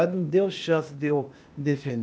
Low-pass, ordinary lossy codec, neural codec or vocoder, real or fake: none; none; codec, 16 kHz, 0.8 kbps, ZipCodec; fake